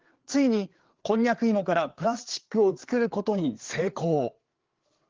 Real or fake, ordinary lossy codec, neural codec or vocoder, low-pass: fake; Opus, 16 kbps; codec, 16 kHz, 4 kbps, FreqCodec, larger model; 7.2 kHz